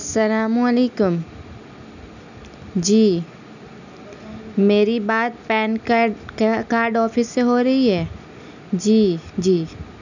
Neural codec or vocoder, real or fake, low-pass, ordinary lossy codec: none; real; 7.2 kHz; none